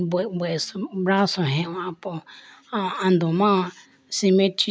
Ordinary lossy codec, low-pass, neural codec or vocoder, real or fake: none; none; none; real